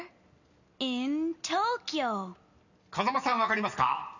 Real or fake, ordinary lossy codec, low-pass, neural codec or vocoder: real; none; 7.2 kHz; none